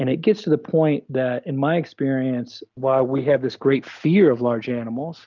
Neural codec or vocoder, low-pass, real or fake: none; 7.2 kHz; real